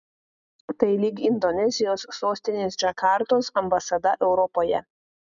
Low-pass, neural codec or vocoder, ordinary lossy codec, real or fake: 7.2 kHz; none; MP3, 96 kbps; real